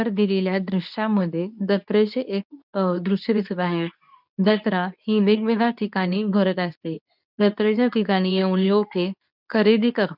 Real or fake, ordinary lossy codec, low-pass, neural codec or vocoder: fake; none; 5.4 kHz; codec, 24 kHz, 0.9 kbps, WavTokenizer, medium speech release version 2